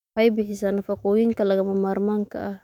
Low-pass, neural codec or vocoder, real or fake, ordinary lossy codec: 19.8 kHz; autoencoder, 48 kHz, 128 numbers a frame, DAC-VAE, trained on Japanese speech; fake; none